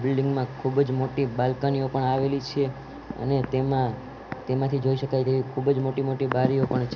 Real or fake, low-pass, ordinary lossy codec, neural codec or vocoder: real; 7.2 kHz; none; none